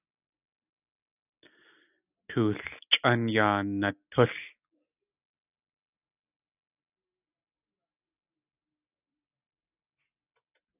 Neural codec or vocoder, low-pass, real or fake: none; 3.6 kHz; real